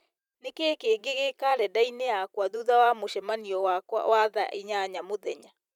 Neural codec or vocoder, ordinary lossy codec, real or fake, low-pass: vocoder, 44.1 kHz, 128 mel bands every 512 samples, BigVGAN v2; none; fake; 19.8 kHz